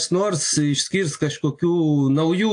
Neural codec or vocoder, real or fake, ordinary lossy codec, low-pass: none; real; AAC, 48 kbps; 9.9 kHz